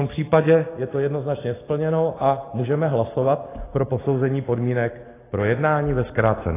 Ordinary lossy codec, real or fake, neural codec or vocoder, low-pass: AAC, 16 kbps; real; none; 3.6 kHz